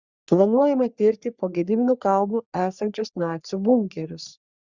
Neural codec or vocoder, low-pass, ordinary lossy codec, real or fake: codec, 44.1 kHz, 3.4 kbps, Pupu-Codec; 7.2 kHz; Opus, 64 kbps; fake